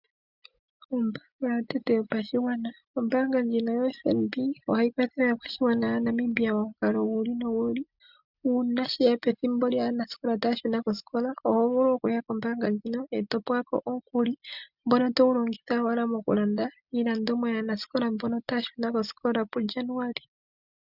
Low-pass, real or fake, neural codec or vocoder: 5.4 kHz; real; none